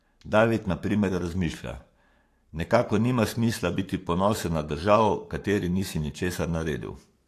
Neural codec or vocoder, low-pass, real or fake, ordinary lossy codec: codec, 44.1 kHz, 7.8 kbps, Pupu-Codec; 14.4 kHz; fake; AAC, 64 kbps